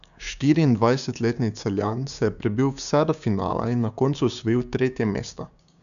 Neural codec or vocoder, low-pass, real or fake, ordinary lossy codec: codec, 16 kHz, 6 kbps, DAC; 7.2 kHz; fake; none